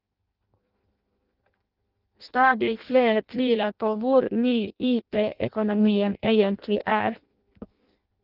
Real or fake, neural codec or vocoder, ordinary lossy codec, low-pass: fake; codec, 16 kHz in and 24 kHz out, 0.6 kbps, FireRedTTS-2 codec; Opus, 32 kbps; 5.4 kHz